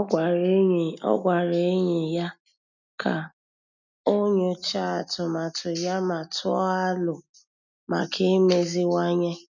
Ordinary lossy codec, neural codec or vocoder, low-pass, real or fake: none; none; 7.2 kHz; real